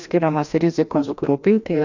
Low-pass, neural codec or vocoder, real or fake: 7.2 kHz; codec, 16 kHz, 1 kbps, FreqCodec, larger model; fake